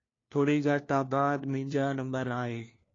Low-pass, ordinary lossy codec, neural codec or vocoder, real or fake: 7.2 kHz; AAC, 32 kbps; codec, 16 kHz, 1 kbps, FunCodec, trained on LibriTTS, 50 frames a second; fake